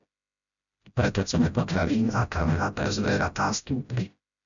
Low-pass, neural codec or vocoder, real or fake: 7.2 kHz; codec, 16 kHz, 0.5 kbps, FreqCodec, smaller model; fake